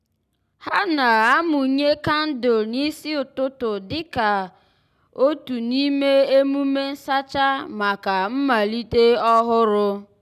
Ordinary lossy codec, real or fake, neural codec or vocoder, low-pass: none; real; none; 14.4 kHz